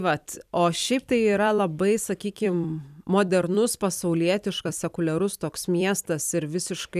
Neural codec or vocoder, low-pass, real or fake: vocoder, 44.1 kHz, 128 mel bands every 256 samples, BigVGAN v2; 14.4 kHz; fake